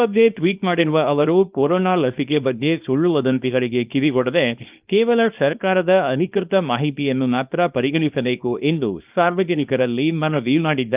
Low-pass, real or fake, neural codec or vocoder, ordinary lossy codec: 3.6 kHz; fake; codec, 24 kHz, 0.9 kbps, WavTokenizer, small release; Opus, 24 kbps